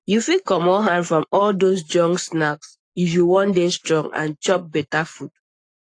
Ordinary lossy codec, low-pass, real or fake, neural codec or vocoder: AAC, 48 kbps; 9.9 kHz; fake; codec, 44.1 kHz, 7.8 kbps, Pupu-Codec